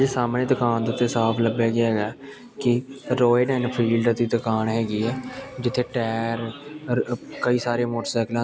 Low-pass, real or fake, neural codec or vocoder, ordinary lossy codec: none; real; none; none